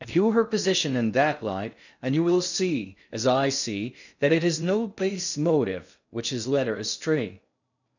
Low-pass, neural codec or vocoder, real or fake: 7.2 kHz; codec, 16 kHz in and 24 kHz out, 0.6 kbps, FocalCodec, streaming, 2048 codes; fake